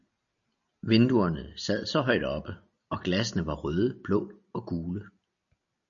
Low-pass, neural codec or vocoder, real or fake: 7.2 kHz; none; real